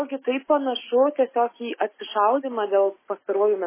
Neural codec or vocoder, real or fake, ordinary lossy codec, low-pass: none; real; MP3, 16 kbps; 3.6 kHz